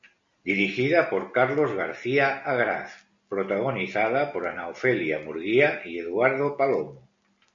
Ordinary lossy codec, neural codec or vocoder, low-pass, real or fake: MP3, 64 kbps; none; 7.2 kHz; real